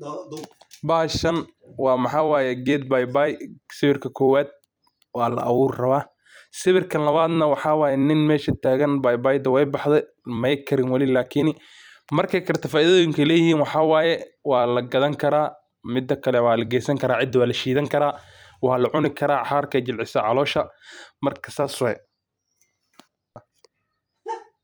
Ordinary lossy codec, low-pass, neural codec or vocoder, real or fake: none; none; vocoder, 44.1 kHz, 128 mel bands every 256 samples, BigVGAN v2; fake